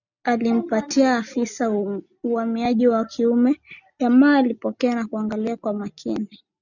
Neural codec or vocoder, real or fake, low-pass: none; real; 7.2 kHz